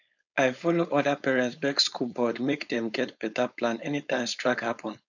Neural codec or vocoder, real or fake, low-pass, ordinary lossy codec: codec, 16 kHz, 4.8 kbps, FACodec; fake; 7.2 kHz; none